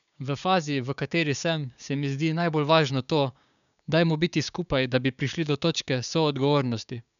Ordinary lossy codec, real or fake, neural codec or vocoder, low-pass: none; fake; codec, 16 kHz, 6 kbps, DAC; 7.2 kHz